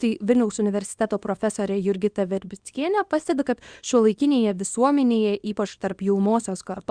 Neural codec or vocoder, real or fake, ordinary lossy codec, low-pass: codec, 24 kHz, 0.9 kbps, WavTokenizer, small release; fake; Opus, 64 kbps; 9.9 kHz